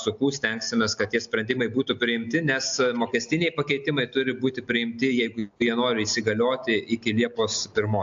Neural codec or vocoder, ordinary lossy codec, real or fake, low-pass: none; AAC, 64 kbps; real; 7.2 kHz